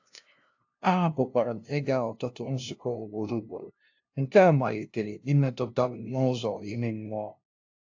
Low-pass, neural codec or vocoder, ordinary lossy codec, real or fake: 7.2 kHz; codec, 16 kHz, 0.5 kbps, FunCodec, trained on LibriTTS, 25 frames a second; AAC, 48 kbps; fake